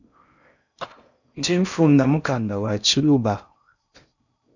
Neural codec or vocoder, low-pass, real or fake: codec, 16 kHz in and 24 kHz out, 0.6 kbps, FocalCodec, streaming, 4096 codes; 7.2 kHz; fake